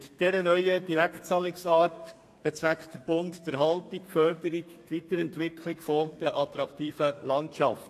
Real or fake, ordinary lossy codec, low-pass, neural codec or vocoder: fake; AAC, 64 kbps; 14.4 kHz; codec, 32 kHz, 1.9 kbps, SNAC